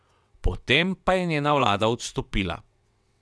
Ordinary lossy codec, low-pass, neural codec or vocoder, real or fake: none; none; vocoder, 22.05 kHz, 80 mel bands, WaveNeXt; fake